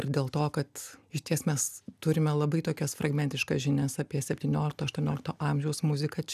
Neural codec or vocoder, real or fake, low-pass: none; real; 14.4 kHz